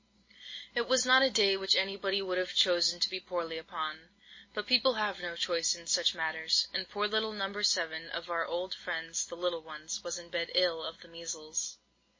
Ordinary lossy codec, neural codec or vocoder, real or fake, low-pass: MP3, 32 kbps; none; real; 7.2 kHz